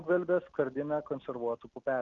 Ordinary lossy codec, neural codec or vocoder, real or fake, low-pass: Opus, 32 kbps; none; real; 7.2 kHz